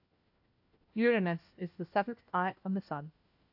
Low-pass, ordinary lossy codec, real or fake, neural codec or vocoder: 5.4 kHz; AAC, 48 kbps; fake; codec, 16 kHz, 1 kbps, FunCodec, trained on LibriTTS, 50 frames a second